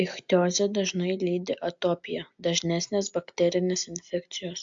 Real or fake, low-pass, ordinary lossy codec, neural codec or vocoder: real; 7.2 kHz; AAC, 64 kbps; none